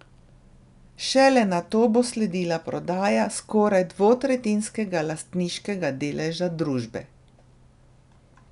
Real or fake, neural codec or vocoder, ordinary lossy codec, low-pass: real; none; none; 10.8 kHz